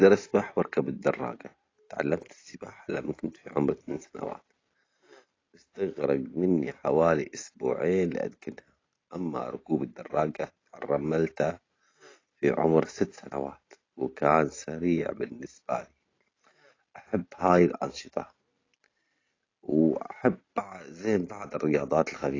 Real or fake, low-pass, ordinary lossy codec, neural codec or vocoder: real; 7.2 kHz; AAC, 32 kbps; none